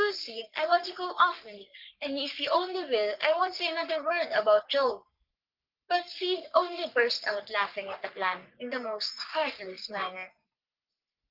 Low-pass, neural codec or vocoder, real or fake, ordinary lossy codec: 5.4 kHz; codec, 44.1 kHz, 3.4 kbps, Pupu-Codec; fake; Opus, 32 kbps